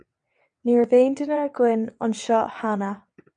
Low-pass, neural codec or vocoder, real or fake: 9.9 kHz; vocoder, 22.05 kHz, 80 mel bands, WaveNeXt; fake